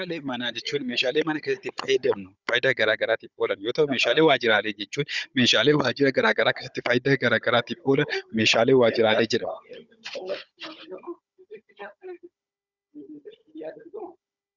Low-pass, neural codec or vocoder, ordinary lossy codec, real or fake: 7.2 kHz; codec, 16 kHz, 16 kbps, FunCodec, trained on Chinese and English, 50 frames a second; Opus, 64 kbps; fake